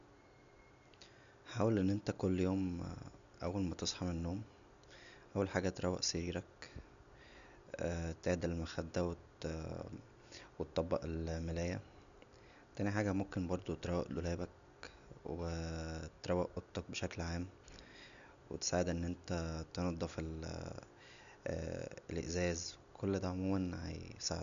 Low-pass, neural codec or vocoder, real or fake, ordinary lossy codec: 7.2 kHz; none; real; none